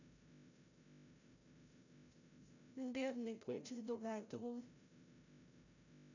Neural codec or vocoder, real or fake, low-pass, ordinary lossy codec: codec, 16 kHz, 0.5 kbps, FreqCodec, larger model; fake; 7.2 kHz; none